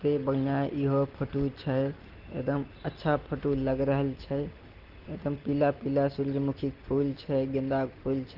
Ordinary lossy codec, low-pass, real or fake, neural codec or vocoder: Opus, 16 kbps; 5.4 kHz; real; none